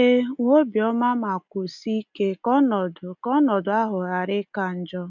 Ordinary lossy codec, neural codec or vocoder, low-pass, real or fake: none; none; 7.2 kHz; real